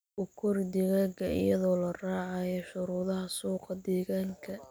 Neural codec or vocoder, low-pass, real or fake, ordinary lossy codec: none; none; real; none